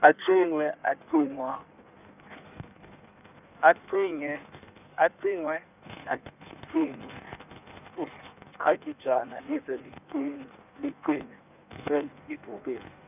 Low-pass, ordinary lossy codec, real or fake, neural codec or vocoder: 3.6 kHz; none; fake; codec, 16 kHz in and 24 kHz out, 1.1 kbps, FireRedTTS-2 codec